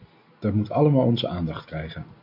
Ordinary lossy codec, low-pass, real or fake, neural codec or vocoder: MP3, 48 kbps; 5.4 kHz; real; none